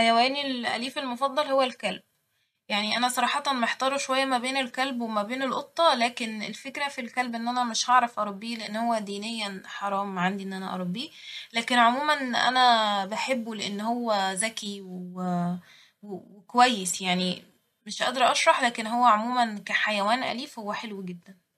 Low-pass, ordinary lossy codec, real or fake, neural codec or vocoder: 14.4 kHz; MP3, 64 kbps; real; none